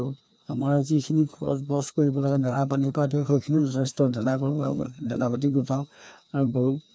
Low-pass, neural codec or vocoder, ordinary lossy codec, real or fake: none; codec, 16 kHz, 2 kbps, FreqCodec, larger model; none; fake